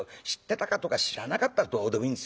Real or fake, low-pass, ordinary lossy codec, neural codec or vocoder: real; none; none; none